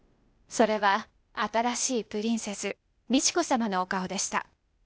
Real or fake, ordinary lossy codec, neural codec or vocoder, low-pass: fake; none; codec, 16 kHz, 0.8 kbps, ZipCodec; none